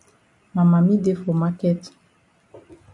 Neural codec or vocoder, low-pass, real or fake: none; 10.8 kHz; real